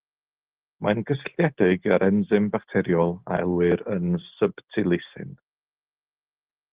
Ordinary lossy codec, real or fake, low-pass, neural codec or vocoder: Opus, 16 kbps; real; 3.6 kHz; none